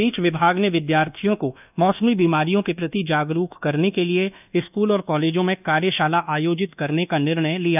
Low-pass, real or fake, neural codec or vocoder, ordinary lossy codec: 3.6 kHz; fake; codec, 24 kHz, 1.2 kbps, DualCodec; none